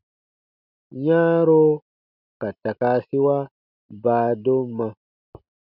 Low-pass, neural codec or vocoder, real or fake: 5.4 kHz; none; real